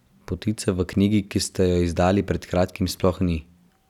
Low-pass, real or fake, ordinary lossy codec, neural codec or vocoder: 19.8 kHz; real; none; none